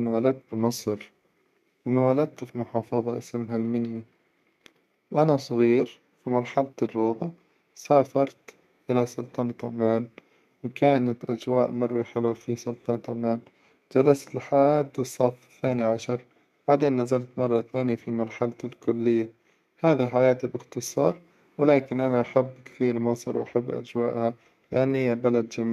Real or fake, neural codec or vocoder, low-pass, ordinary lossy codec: fake; codec, 32 kHz, 1.9 kbps, SNAC; 14.4 kHz; MP3, 96 kbps